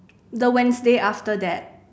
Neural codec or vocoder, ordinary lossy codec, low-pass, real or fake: none; none; none; real